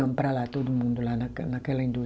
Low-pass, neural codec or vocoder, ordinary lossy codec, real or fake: none; none; none; real